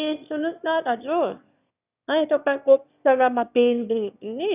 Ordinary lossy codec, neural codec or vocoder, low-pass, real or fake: none; autoencoder, 22.05 kHz, a latent of 192 numbers a frame, VITS, trained on one speaker; 3.6 kHz; fake